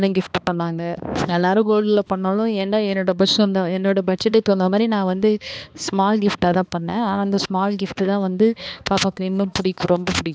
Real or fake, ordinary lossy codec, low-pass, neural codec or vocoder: fake; none; none; codec, 16 kHz, 2 kbps, X-Codec, HuBERT features, trained on balanced general audio